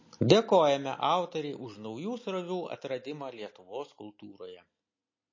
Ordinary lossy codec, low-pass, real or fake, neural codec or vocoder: MP3, 32 kbps; 7.2 kHz; real; none